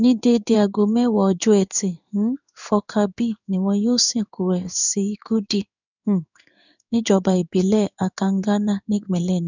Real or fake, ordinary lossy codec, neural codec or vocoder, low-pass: fake; none; codec, 16 kHz in and 24 kHz out, 1 kbps, XY-Tokenizer; 7.2 kHz